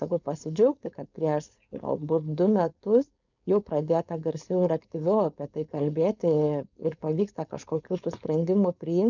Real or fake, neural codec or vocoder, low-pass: fake; codec, 16 kHz, 4.8 kbps, FACodec; 7.2 kHz